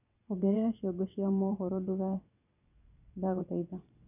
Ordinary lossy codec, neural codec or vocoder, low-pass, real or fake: none; vocoder, 22.05 kHz, 80 mel bands, WaveNeXt; 3.6 kHz; fake